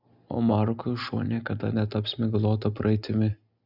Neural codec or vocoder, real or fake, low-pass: none; real; 5.4 kHz